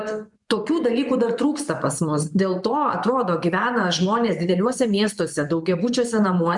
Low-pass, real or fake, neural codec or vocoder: 10.8 kHz; fake; vocoder, 24 kHz, 100 mel bands, Vocos